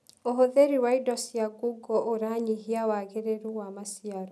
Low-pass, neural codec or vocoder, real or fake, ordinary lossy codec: none; none; real; none